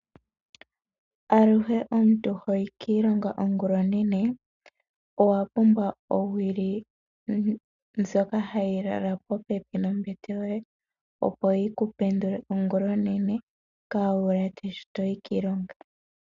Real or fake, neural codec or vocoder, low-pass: real; none; 7.2 kHz